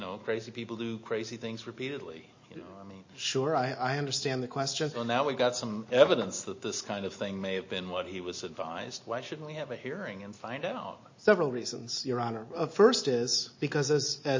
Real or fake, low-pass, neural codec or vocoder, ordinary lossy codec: real; 7.2 kHz; none; MP3, 32 kbps